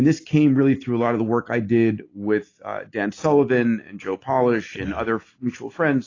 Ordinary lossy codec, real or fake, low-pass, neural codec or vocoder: AAC, 32 kbps; real; 7.2 kHz; none